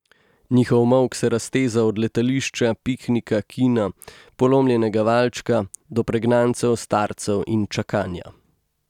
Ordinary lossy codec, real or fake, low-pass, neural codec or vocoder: none; real; 19.8 kHz; none